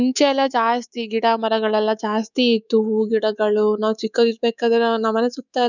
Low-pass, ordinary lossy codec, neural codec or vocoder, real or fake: 7.2 kHz; none; codec, 24 kHz, 3.1 kbps, DualCodec; fake